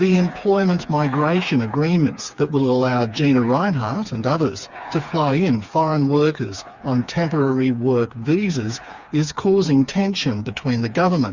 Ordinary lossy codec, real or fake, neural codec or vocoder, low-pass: Opus, 64 kbps; fake; codec, 16 kHz, 4 kbps, FreqCodec, smaller model; 7.2 kHz